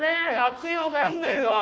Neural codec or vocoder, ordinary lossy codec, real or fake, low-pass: codec, 16 kHz, 1 kbps, FunCodec, trained on Chinese and English, 50 frames a second; none; fake; none